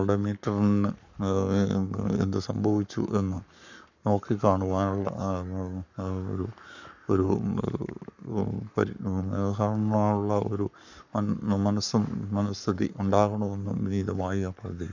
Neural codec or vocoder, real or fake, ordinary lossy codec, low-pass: codec, 44.1 kHz, 7.8 kbps, Pupu-Codec; fake; none; 7.2 kHz